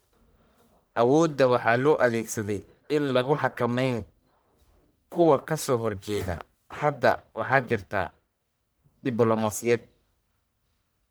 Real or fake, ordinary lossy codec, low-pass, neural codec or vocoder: fake; none; none; codec, 44.1 kHz, 1.7 kbps, Pupu-Codec